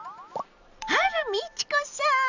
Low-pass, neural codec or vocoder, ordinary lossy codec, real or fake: 7.2 kHz; none; none; real